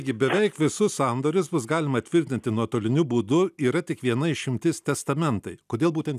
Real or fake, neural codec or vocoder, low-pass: real; none; 14.4 kHz